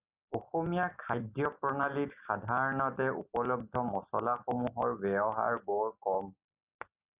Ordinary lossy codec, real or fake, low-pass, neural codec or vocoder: Opus, 64 kbps; real; 3.6 kHz; none